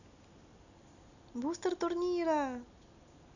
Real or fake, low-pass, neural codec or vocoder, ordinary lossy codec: real; 7.2 kHz; none; none